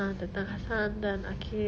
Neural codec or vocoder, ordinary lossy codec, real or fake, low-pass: none; none; real; none